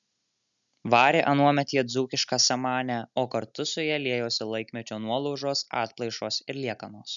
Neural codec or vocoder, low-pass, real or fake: none; 7.2 kHz; real